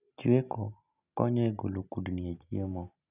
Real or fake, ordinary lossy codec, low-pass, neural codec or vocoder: real; none; 3.6 kHz; none